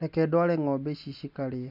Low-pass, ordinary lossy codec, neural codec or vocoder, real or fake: 5.4 kHz; none; none; real